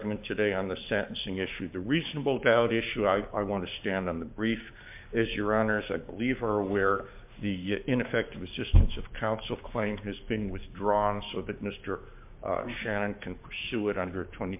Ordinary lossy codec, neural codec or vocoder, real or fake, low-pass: MP3, 32 kbps; codec, 16 kHz, 6 kbps, DAC; fake; 3.6 kHz